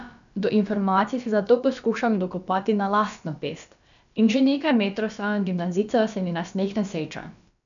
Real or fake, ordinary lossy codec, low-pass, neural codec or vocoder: fake; none; 7.2 kHz; codec, 16 kHz, about 1 kbps, DyCAST, with the encoder's durations